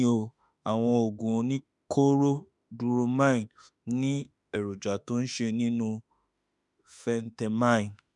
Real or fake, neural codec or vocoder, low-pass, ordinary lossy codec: fake; autoencoder, 48 kHz, 32 numbers a frame, DAC-VAE, trained on Japanese speech; 10.8 kHz; none